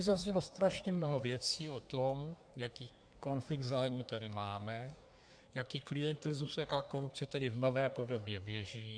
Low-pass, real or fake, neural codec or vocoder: 9.9 kHz; fake; codec, 24 kHz, 1 kbps, SNAC